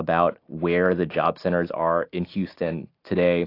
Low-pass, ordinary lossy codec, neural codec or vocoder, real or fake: 5.4 kHz; AAC, 32 kbps; none; real